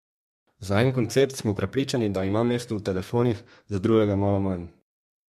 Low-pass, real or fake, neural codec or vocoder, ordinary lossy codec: 14.4 kHz; fake; codec, 32 kHz, 1.9 kbps, SNAC; MP3, 64 kbps